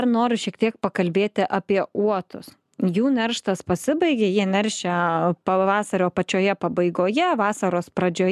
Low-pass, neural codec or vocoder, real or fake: 14.4 kHz; none; real